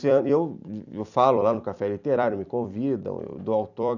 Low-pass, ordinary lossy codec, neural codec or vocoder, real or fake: 7.2 kHz; none; vocoder, 44.1 kHz, 128 mel bands every 256 samples, BigVGAN v2; fake